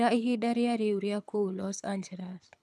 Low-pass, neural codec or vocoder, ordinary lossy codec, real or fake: none; codec, 24 kHz, 6 kbps, HILCodec; none; fake